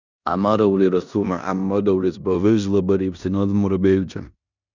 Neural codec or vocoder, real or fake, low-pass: codec, 16 kHz in and 24 kHz out, 0.9 kbps, LongCat-Audio-Codec, four codebook decoder; fake; 7.2 kHz